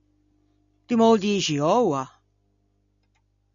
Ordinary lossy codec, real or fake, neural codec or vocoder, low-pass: AAC, 64 kbps; real; none; 7.2 kHz